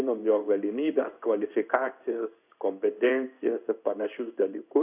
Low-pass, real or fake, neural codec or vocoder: 3.6 kHz; fake; codec, 16 kHz in and 24 kHz out, 1 kbps, XY-Tokenizer